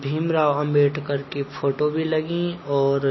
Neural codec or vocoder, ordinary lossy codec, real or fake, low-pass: none; MP3, 24 kbps; real; 7.2 kHz